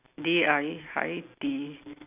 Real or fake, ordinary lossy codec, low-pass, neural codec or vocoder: real; none; 3.6 kHz; none